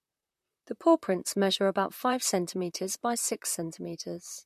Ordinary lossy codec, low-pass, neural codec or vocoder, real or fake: MP3, 64 kbps; 14.4 kHz; vocoder, 44.1 kHz, 128 mel bands, Pupu-Vocoder; fake